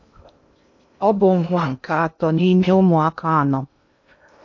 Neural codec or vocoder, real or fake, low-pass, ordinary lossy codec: codec, 16 kHz in and 24 kHz out, 0.6 kbps, FocalCodec, streaming, 2048 codes; fake; 7.2 kHz; AAC, 48 kbps